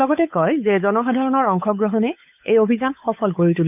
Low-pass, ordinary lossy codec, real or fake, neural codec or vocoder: 3.6 kHz; none; fake; codec, 16 kHz, 8 kbps, FunCodec, trained on Chinese and English, 25 frames a second